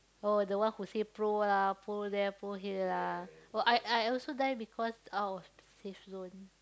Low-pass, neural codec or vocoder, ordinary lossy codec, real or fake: none; none; none; real